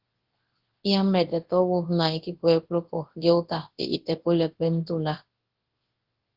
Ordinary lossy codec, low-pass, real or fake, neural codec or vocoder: Opus, 16 kbps; 5.4 kHz; fake; codec, 24 kHz, 0.9 kbps, WavTokenizer, large speech release